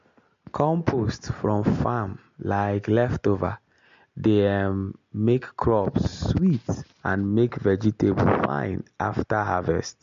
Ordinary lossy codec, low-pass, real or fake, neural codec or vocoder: MP3, 48 kbps; 7.2 kHz; real; none